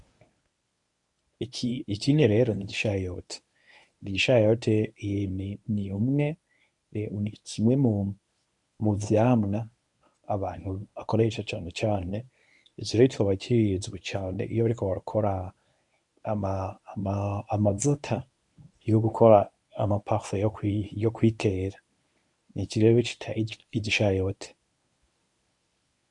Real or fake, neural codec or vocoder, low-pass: fake; codec, 24 kHz, 0.9 kbps, WavTokenizer, medium speech release version 1; 10.8 kHz